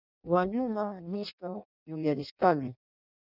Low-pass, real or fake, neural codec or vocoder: 5.4 kHz; fake; codec, 16 kHz in and 24 kHz out, 0.6 kbps, FireRedTTS-2 codec